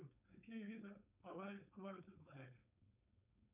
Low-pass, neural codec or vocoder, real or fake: 3.6 kHz; codec, 16 kHz, 4.8 kbps, FACodec; fake